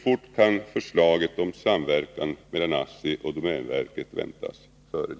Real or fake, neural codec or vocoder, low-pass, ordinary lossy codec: real; none; none; none